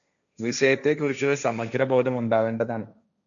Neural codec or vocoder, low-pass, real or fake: codec, 16 kHz, 1.1 kbps, Voila-Tokenizer; 7.2 kHz; fake